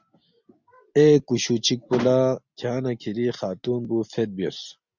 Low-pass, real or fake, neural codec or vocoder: 7.2 kHz; real; none